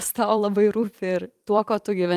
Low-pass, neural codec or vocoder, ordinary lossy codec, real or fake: 14.4 kHz; vocoder, 44.1 kHz, 128 mel bands every 256 samples, BigVGAN v2; Opus, 32 kbps; fake